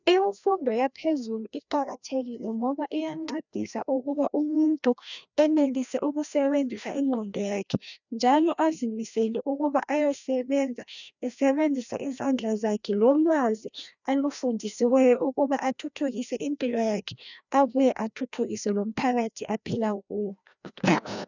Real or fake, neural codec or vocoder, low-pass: fake; codec, 16 kHz, 1 kbps, FreqCodec, larger model; 7.2 kHz